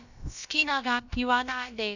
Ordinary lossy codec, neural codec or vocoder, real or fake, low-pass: none; codec, 16 kHz, about 1 kbps, DyCAST, with the encoder's durations; fake; 7.2 kHz